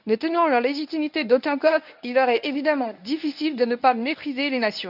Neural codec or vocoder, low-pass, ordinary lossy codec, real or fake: codec, 24 kHz, 0.9 kbps, WavTokenizer, medium speech release version 1; 5.4 kHz; none; fake